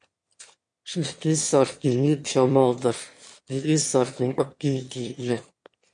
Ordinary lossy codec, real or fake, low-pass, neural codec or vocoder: MP3, 48 kbps; fake; 9.9 kHz; autoencoder, 22.05 kHz, a latent of 192 numbers a frame, VITS, trained on one speaker